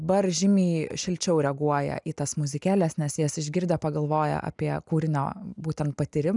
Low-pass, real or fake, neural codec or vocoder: 10.8 kHz; real; none